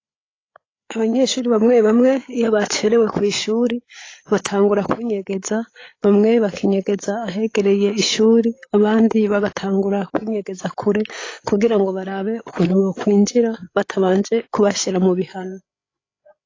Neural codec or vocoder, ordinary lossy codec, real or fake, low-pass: codec, 16 kHz, 8 kbps, FreqCodec, larger model; AAC, 32 kbps; fake; 7.2 kHz